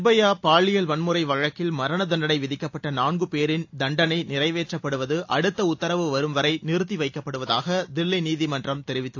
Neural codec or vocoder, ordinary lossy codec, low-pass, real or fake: none; AAC, 48 kbps; 7.2 kHz; real